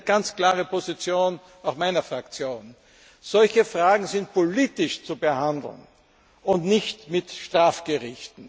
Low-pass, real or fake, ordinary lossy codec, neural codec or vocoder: none; real; none; none